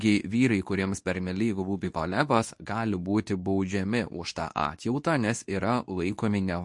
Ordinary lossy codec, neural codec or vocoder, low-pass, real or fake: MP3, 48 kbps; codec, 24 kHz, 0.9 kbps, WavTokenizer, medium speech release version 2; 10.8 kHz; fake